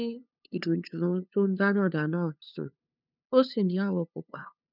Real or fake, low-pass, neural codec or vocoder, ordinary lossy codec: fake; 5.4 kHz; codec, 16 kHz, 2 kbps, FunCodec, trained on LibriTTS, 25 frames a second; none